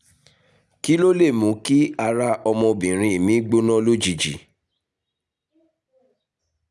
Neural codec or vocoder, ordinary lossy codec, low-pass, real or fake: none; none; none; real